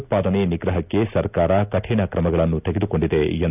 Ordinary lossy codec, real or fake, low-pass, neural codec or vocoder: none; real; 3.6 kHz; none